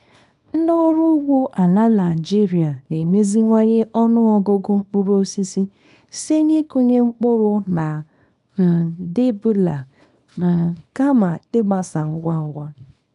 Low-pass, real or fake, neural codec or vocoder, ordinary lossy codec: 10.8 kHz; fake; codec, 24 kHz, 0.9 kbps, WavTokenizer, small release; none